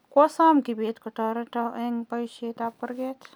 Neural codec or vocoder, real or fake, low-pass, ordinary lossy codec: none; real; none; none